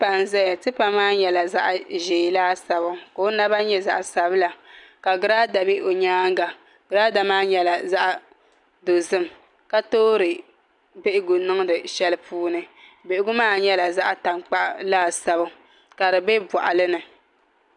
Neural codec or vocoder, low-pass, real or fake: none; 10.8 kHz; real